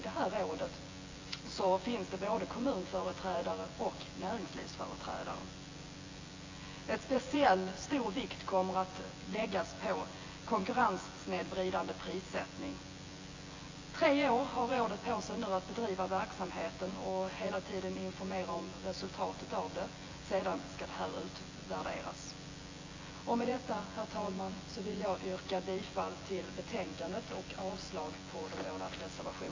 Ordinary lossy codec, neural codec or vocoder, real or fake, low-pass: AAC, 32 kbps; vocoder, 24 kHz, 100 mel bands, Vocos; fake; 7.2 kHz